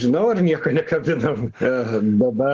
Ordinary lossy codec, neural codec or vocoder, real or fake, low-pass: Opus, 16 kbps; none; real; 7.2 kHz